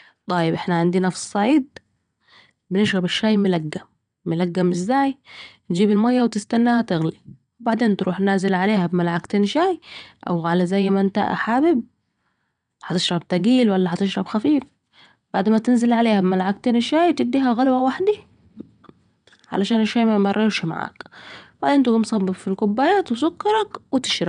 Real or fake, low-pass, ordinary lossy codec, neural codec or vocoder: fake; 9.9 kHz; none; vocoder, 22.05 kHz, 80 mel bands, WaveNeXt